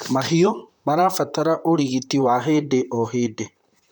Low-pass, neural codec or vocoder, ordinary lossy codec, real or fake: 19.8 kHz; vocoder, 44.1 kHz, 128 mel bands, Pupu-Vocoder; none; fake